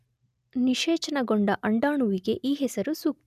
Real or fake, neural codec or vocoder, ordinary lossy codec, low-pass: real; none; none; 14.4 kHz